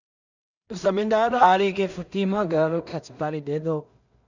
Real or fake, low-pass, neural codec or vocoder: fake; 7.2 kHz; codec, 16 kHz in and 24 kHz out, 0.4 kbps, LongCat-Audio-Codec, two codebook decoder